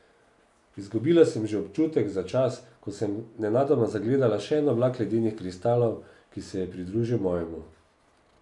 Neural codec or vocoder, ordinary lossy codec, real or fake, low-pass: none; none; real; 10.8 kHz